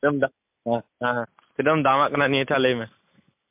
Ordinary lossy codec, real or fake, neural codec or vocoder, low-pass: MP3, 32 kbps; real; none; 3.6 kHz